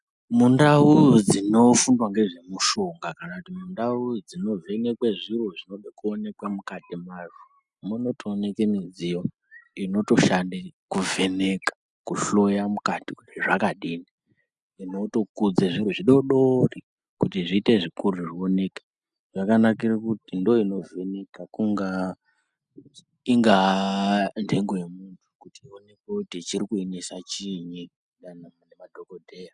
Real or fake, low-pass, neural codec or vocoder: real; 10.8 kHz; none